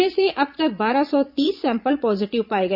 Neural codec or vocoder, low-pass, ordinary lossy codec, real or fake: vocoder, 22.05 kHz, 80 mel bands, Vocos; 5.4 kHz; none; fake